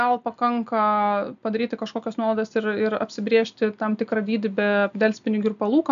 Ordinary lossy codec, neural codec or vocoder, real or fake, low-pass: AAC, 96 kbps; none; real; 7.2 kHz